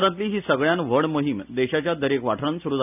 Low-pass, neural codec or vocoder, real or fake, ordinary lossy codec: 3.6 kHz; none; real; none